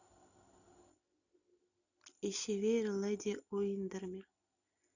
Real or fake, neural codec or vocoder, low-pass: real; none; 7.2 kHz